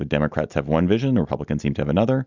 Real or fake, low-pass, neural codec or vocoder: real; 7.2 kHz; none